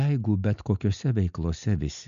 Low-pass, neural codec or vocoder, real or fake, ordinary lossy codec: 7.2 kHz; none; real; AAC, 96 kbps